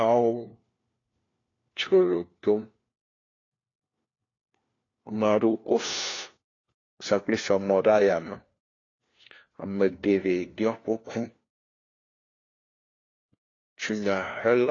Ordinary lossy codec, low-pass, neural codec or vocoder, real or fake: AAC, 32 kbps; 7.2 kHz; codec, 16 kHz, 1 kbps, FunCodec, trained on LibriTTS, 50 frames a second; fake